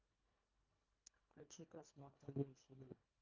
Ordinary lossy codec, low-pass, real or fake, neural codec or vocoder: Opus, 24 kbps; 7.2 kHz; fake; codec, 24 kHz, 1.5 kbps, HILCodec